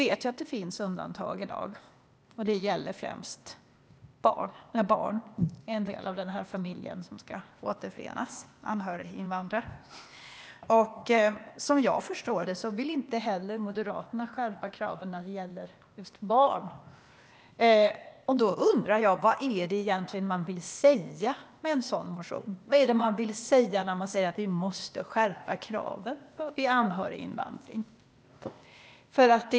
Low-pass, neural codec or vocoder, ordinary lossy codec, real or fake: none; codec, 16 kHz, 0.8 kbps, ZipCodec; none; fake